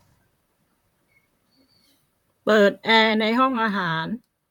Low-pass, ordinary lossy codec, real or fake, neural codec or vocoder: 19.8 kHz; none; fake; vocoder, 44.1 kHz, 128 mel bands, Pupu-Vocoder